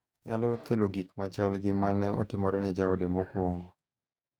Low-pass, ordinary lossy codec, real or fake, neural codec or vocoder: 19.8 kHz; none; fake; codec, 44.1 kHz, 2.6 kbps, DAC